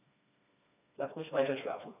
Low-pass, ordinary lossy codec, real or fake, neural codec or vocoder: 3.6 kHz; none; fake; codec, 16 kHz, 2 kbps, FreqCodec, smaller model